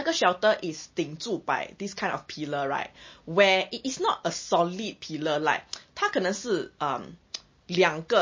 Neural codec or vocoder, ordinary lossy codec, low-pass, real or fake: none; MP3, 32 kbps; 7.2 kHz; real